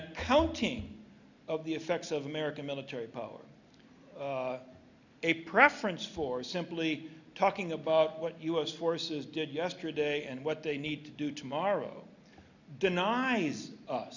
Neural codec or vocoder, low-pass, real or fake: none; 7.2 kHz; real